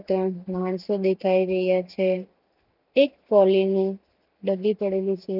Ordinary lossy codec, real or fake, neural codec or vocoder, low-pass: none; real; none; 5.4 kHz